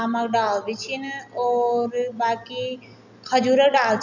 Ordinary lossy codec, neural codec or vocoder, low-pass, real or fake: none; none; 7.2 kHz; real